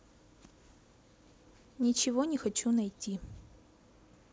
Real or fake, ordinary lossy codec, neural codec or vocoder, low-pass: real; none; none; none